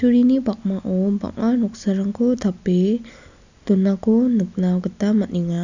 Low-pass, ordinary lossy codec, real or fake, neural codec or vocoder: 7.2 kHz; none; real; none